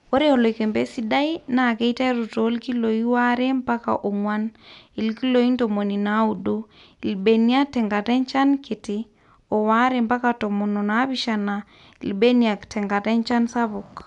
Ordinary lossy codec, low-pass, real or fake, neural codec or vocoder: none; 10.8 kHz; real; none